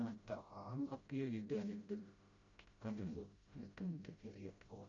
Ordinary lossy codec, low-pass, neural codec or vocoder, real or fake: Opus, 64 kbps; 7.2 kHz; codec, 16 kHz, 0.5 kbps, FreqCodec, smaller model; fake